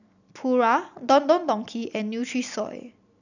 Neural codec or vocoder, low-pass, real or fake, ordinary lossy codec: none; 7.2 kHz; real; none